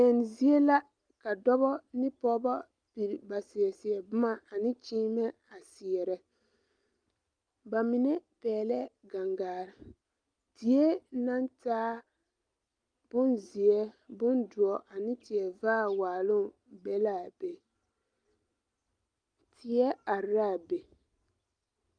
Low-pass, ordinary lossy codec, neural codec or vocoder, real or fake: 9.9 kHz; Opus, 32 kbps; none; real